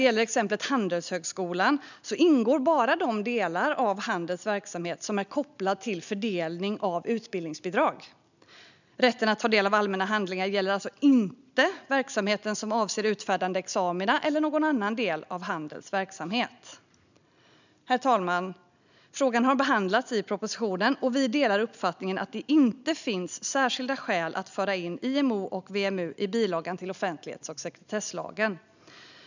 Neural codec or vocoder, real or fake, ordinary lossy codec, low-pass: none; real; none; 7.2 kHz